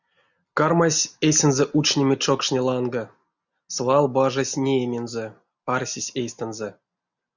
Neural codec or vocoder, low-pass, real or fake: none; 7.2 kHz; real